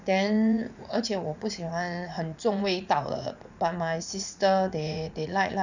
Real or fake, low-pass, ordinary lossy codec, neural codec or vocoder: fake; 7.2 kHz; none; vocoder, 44.1 kHz, 80 mel bands, Vocos